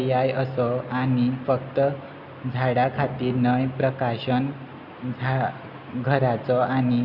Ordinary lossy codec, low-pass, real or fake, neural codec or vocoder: Opus, 64 kbps; 5.4 kHz; real; none